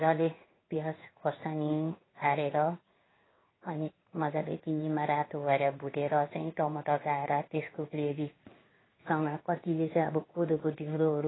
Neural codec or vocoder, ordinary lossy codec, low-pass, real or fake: codec, 16 kHz in and 24 kHz out, 1 kbps, XY-Tokenizer; AAC, 16 kbps; 7.2 kHz; fake